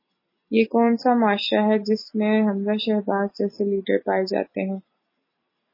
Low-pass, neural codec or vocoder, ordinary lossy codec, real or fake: 5.4 kHz; none; MP3, 24 kbps; real